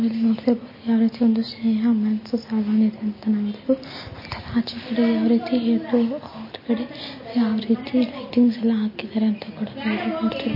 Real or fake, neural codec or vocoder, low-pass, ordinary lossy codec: real; none; 5.4 kHz; MP3, 24 kbps